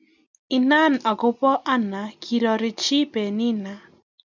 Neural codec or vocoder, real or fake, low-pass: none; real; 7.2 kHz